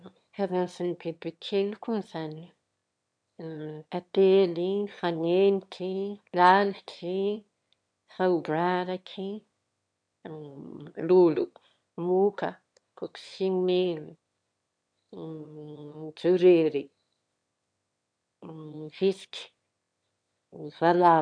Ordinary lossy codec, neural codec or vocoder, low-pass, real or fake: MP3, 64 kbps; autoencoder, 22.05 kHz, a latent of 192 numbers a frame, VITS, trained on one speaker; 9.9 kHz; fake